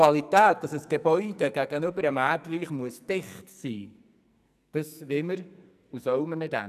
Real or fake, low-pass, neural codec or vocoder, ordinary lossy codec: fake; 14.4 kHz; codec, 44.1 kHz, 2.6 kbps, SNAC; none